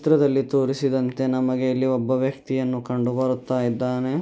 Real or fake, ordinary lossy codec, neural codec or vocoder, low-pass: real; none; none; none